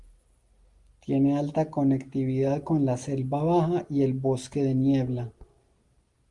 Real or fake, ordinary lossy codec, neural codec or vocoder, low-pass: real; Opus, 32 kbps; none; 10.8 kHz